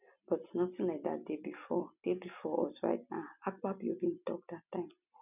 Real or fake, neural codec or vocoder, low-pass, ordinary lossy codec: real; none; 3.6 kHz; none